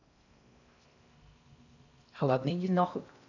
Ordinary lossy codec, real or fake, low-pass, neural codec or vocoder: none; fake; 7.2 kHz; codec, 16 kHz in and 24 kHz out, 0.6 kbps, FocalCodec, streaming, 4096 codes